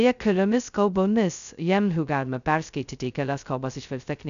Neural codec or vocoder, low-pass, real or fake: codec, 16 kHz, 0.2 kbps, FocalCodec; 7.2 kHz; fake